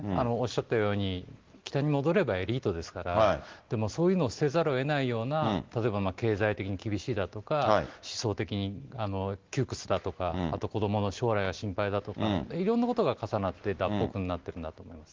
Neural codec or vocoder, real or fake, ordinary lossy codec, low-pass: none; real; Opus, 16 kbps; 7.2 kHz